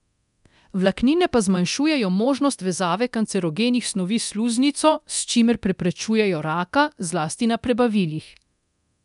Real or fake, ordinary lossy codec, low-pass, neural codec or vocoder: fake; none; 10.8 kHz; codec, 24 kHz, 0.9 kbps, DualCodec